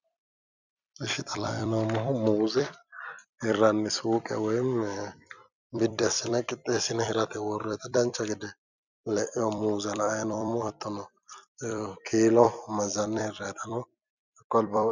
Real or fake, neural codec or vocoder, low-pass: fake; vocoder, 44.1 kHz, 128 mel bands every 256 samples, BigVGAN v2; 7.2 kHz